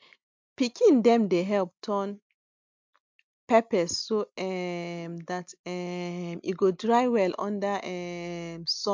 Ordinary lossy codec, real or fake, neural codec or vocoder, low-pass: MP3, 64 kbps; real; none; 7.2 kHz